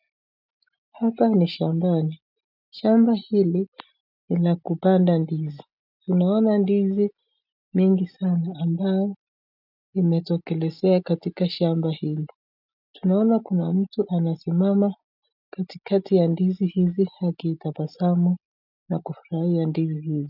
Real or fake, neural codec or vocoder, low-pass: real; none; 5.4 kHz